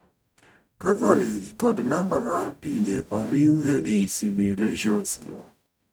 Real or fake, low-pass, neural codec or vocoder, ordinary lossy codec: fake; none; codec, 44.1 kHz, 0.9 kbps, DAC; none